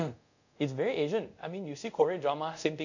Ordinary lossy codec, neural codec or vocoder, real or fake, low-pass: none; codec, 24 kHz, 0.5 kbps, DualCodec; fake; 7.2 kHz